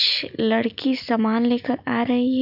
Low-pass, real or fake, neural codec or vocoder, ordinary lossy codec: 5.4 kHz; real; none; none